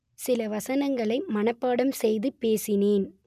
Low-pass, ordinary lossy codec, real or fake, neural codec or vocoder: 14.4 kHz; none; real; none